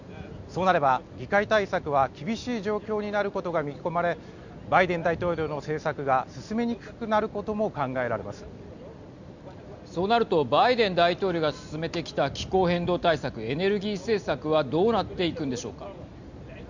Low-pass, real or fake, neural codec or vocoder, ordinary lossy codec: 7.2 kHz; real; none; Opus, 64 kbps